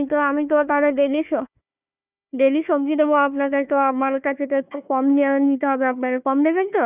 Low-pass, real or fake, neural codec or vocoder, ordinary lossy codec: 3.6 kHz; fake; codec, 16 kHz, 1 kbps, FunCodec, trained on Chinese and English, 50 frames a second; none